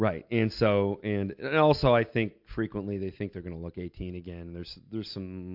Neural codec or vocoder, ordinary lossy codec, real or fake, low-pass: none; MP3, 48 kbps; real; 5.4 kHz